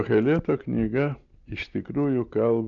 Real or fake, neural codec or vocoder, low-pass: real; none; 7.2 kHz